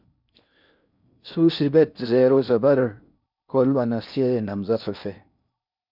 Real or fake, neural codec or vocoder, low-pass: fake; codec, 16 kHz in and 24 kHz out, 0.6 kbps, FocalCodec, streaming, 4096 codes; 5.4 kHz